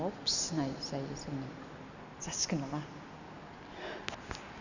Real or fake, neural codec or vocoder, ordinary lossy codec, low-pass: real; none; none; 7.2 kHz